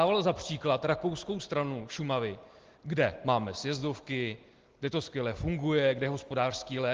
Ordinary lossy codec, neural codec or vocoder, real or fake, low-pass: Opus, 16 kbps; none; real; 7.2 kHz